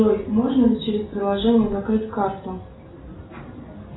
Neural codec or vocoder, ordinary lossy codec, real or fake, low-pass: none; AAC, 16 kbps; real; 7.2 kHz